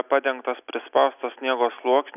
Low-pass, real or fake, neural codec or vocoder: 3.6 kHz; real; none